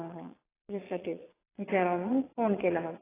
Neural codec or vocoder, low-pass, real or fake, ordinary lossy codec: vocoder, 22.05 kHz, 80 mel bands, Vocos; 3.6 kHz; fake; AAC, 16 kbps